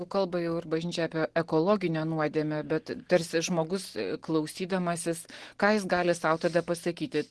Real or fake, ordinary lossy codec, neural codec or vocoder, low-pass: real; Opus, 16 kbps; none; 10.8 kHz